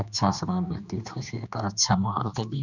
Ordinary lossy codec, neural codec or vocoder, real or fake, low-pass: none; codec, 16 kHz, 2 kbps, X-Codec, HuBERT features, trained on general audio; fake; 7.2 kHz